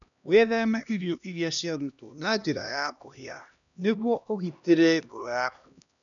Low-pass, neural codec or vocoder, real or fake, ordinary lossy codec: 7.2 kHz; codec, 16 kHz, 1 kbps, X-Codec, HuBERT features, trained on LibriSpeech; fake; none